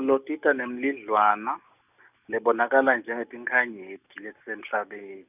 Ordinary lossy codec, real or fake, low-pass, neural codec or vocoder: none; real; 3.6 kHz; none